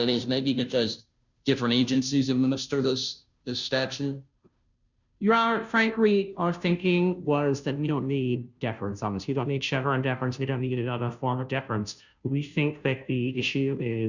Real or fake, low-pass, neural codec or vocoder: fake; 7.2 kHz; codec, 16 kHz, 0.5 kbps, FunCodec, trained on Chinese and English, 25 frames a second